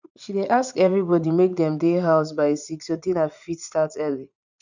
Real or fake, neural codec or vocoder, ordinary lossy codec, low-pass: fake; vocoder, 44.1 kHz, 80 mel bands, Vocos; none; 7.2 kHz